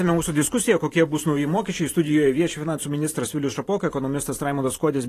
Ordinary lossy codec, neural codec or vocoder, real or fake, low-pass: AAC, 48 kbps; vocoder, 44.1 kHz, 128 mel bands every 512 samples, BigVGAN v2; fake; 14.4 kHz